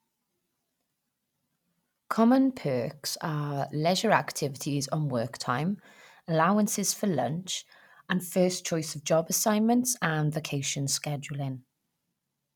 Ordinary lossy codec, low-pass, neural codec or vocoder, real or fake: none; 19.8 kHz; none; real